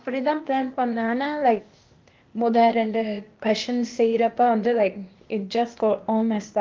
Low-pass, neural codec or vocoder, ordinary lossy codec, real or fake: 7.2 kHz; codec, 16 kHz, 0.8 kbps, ZipCodec; Opus, 32 kbps; fake